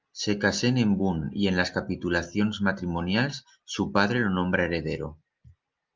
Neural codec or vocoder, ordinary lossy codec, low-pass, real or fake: none; Opus, 24 kbps; 7.2 kHz; real